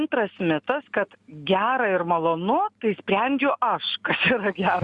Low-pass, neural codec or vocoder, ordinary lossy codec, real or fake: 10.8 kHz; none; Opus, 64 kbps; real